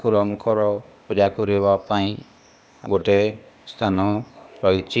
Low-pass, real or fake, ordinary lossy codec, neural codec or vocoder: none; fake; none; codec, 16 kHz, 0.8 kbps, ZipCodec